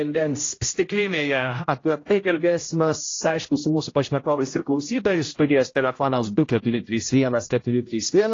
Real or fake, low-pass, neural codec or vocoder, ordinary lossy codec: fake; 7.2 kHz; codec, 16 kHz, 0.5 kbps, X-Codec, HuBERT features, trained on general audio; AAC, 32 kbps